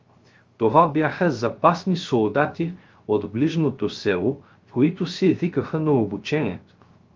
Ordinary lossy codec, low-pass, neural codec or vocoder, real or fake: Opus, 32 kbps; 7.2 kHz; codec, 16 kHz, 0.3 kbps, FocalCodec; fake